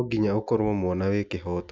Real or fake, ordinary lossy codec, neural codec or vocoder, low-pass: real; none; none; none